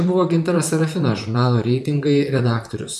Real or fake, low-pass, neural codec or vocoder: fake; 14.4 kHz; vocoder, 44.1 kHz, 128 mel bands, Pupu-Vocoder